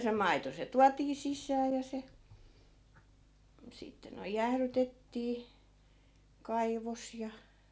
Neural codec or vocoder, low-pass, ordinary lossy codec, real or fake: none; none; none; real